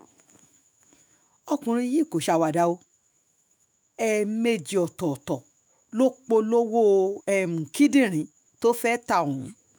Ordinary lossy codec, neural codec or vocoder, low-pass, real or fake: none; autoencoder, 48 kHz, 128 numbers a frame, DAC-VAE, trained on Japanese speech; none; fake